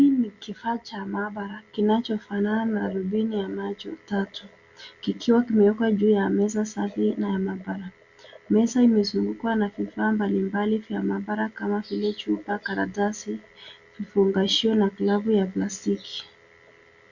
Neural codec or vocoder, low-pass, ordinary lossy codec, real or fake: none; 7.2 kHz; Opus, 64 kbps; real